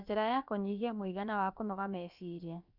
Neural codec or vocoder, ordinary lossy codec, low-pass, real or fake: codec, 16 kHz, about 1 kbps, DyCAST, with the encoder's durations; none; 5.4 kHz; fake